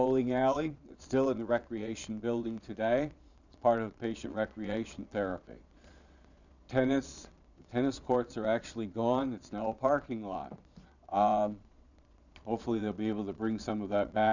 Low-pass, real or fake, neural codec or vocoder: 7.2 kHz; fake; vocoder, 22.05 kHz, 80 mel bands, Vocos